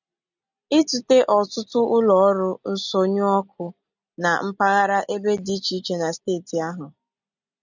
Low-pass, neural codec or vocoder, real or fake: 7.2 kHz; none; real